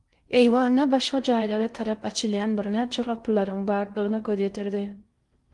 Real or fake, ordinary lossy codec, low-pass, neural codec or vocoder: fake; Opus, 24 kbps; 10.8 kHz; codec, 16 kHz in and 24 kHz out, 0.6 kbps, FocalCodec, streaming, 4096 codes